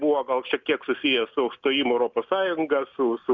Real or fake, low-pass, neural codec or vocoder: real; 7.2 kHz; none